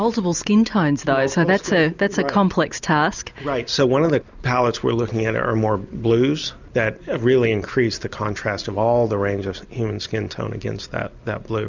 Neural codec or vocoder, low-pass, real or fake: none; 7.2 kHz; real